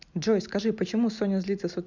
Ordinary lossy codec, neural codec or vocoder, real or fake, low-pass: none; none; real; 7.2 kHz